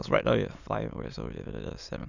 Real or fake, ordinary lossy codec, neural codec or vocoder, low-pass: fake; none; autoencoder, 22.05 kHz, a latent of 192 numbers a frame, VITS, trained on many speakers; 7.2 kHz